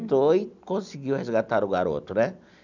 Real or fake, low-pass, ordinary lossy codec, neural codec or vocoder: real; 7.2 kHz; none; none